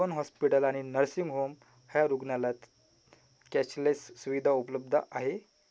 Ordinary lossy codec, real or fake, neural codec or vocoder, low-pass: none; real; none; none